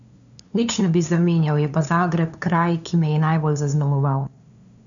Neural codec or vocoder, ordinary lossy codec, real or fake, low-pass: codec, 16 kHz, 2 kbps, FunCodec, trained on LibriTTS, 25 frames a second; none; fake; 7.2 kHz